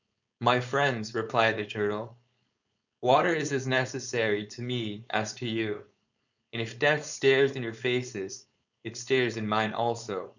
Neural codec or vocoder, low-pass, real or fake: codec, 16 kHz, 4.8 kbps, FACodec; 7.2 kHz; fake